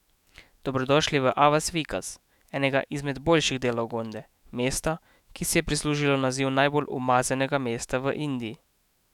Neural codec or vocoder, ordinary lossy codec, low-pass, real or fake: autoencoder, 48 kHz, 128 numbers a frame, DAC-VAE, trained on Japanese speech; none; 19.8 kHz; fake